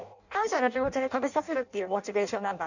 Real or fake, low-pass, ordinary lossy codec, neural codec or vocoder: fake; 7.2 kHz; none; codec, 16 kHz in and 24 kHz out, 0.6 kbps, FireRedTTS-2 codec